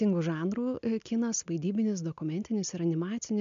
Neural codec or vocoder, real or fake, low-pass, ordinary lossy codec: none; real; 7.2 kHz; MP3, 64 kbps